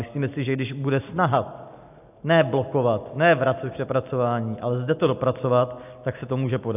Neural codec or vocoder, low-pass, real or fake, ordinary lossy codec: none; 3.6 kHz; real; AAC, 32 kbps